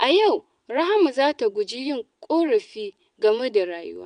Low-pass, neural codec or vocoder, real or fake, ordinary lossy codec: 9.9 kHz; vocoder, 22.05 kHz, 80 mel bands, WaveNeXt; fake; none